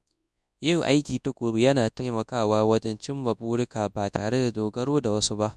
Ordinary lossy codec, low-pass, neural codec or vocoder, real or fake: none; none; codec, 24 kHz, 0.9 kbps, WavTokenizer, large speech release; fake